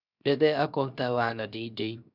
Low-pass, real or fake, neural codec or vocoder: 5.4 kHz; fake; codec, 16 kHz, 0.7 kbps, FocalCodec